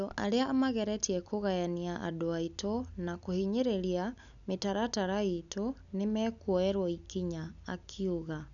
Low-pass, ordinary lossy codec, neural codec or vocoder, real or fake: 7.2 kHz; none; none; real